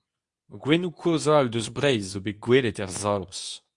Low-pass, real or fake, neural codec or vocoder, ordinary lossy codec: 10.8 kHz; fake; codec, 24 kHz, 0.9 kbps, WavTokenizer, medium speech release version 2; Opus, 64 kbps